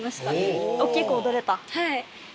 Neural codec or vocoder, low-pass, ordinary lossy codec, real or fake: none; none; none; real